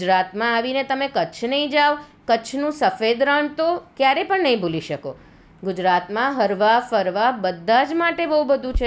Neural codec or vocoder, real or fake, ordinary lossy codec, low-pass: none; real; none; none